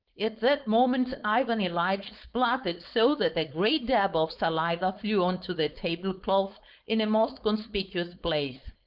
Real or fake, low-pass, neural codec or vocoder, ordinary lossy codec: fake; 5.4 kHz; codec, 16 kHz, 4.8 kbps, FACodec; Opus, 32 kbps